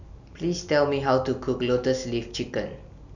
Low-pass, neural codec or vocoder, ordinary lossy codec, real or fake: 7.2 kHz; none; none; real